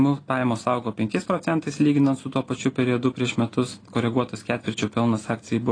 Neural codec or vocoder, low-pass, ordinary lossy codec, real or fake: none; 9.9 kHz; AAC, 32 kbps; real